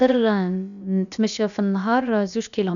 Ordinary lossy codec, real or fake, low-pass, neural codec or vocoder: none; fake; 7.2 kHz; codec, 16 kHz, about 1 kbps, DyCAST, with the encoder's durations